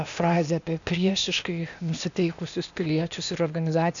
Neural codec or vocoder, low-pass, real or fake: codec, 16 kHz, 0.8 kbps, ZipCodec; 7.2 kHz; fake